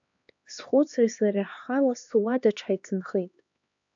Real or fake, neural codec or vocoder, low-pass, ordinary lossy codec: fake; codec, 16 kHz, 2 kbps, X-Codec, HuBERT features, trained on LibriSpeech; 7.2 kHz; AAC, 64 kbps